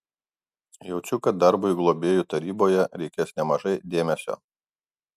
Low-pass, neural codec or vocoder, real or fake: 14.4 kHz; none; real